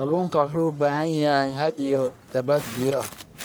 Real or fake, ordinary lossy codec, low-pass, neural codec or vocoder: fake; none; none; codec, 44.1 kHz, 1.7 kbps, Pupu-Codec